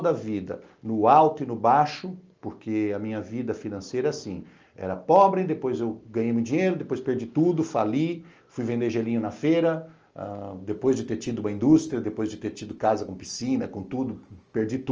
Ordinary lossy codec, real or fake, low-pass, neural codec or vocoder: Opus, 24 kbps; real; 7.2 kHz; none